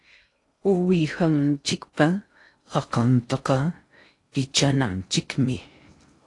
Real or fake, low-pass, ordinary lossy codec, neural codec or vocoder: fake; 10.8 kHz; AAC, 48 kbps; codec, 16 kHz in and 24 kHz out, 0.6 kbps, FocalCodec, streaming, 2048 codes